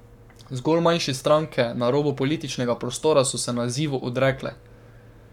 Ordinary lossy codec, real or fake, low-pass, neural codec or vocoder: none; fake; 19.8 kHz; codec, 44.1 kHz, 7.8 kbps, Pupu-Codec